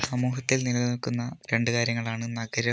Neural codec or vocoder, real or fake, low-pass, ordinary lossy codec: none; real; none; none